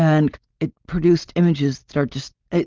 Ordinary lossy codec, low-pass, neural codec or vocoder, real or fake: Opus, 32 kbps; 7.2 kHz; none; real